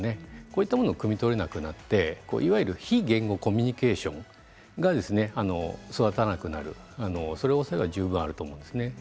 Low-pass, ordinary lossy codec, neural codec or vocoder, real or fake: none; none; none; real